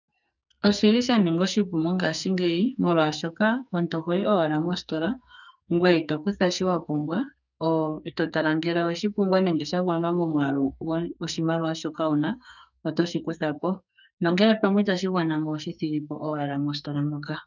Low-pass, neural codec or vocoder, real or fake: 7.2 kHz; codec, 44.1 kHz, 2.6 kbps, SNAC; fake